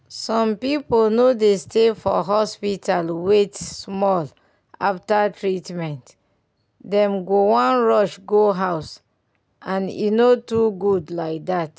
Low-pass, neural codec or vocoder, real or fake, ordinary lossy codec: none; none; real; none